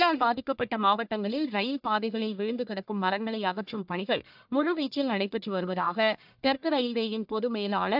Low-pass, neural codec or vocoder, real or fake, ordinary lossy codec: 5.4 kHz; codec, 44.1 kHz, 1.7 kbps, Pupu-Codec; fake; AAC, 48 kbps